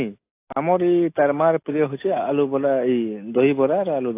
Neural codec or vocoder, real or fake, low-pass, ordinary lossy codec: none; real; 3.6 kHz; AAC, 24 kbps